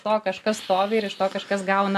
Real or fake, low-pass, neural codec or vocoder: real; 14.4 kHz; none